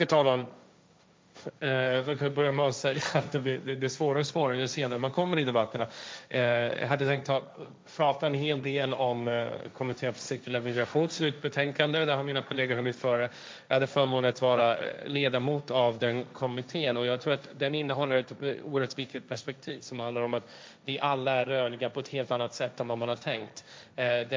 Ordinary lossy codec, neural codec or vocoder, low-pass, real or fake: none; codec, 16 kHz, 1.1 kbps, Voila-Tokenizer; none; fake